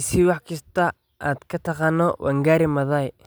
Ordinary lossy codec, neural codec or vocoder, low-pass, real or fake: none; none; none; real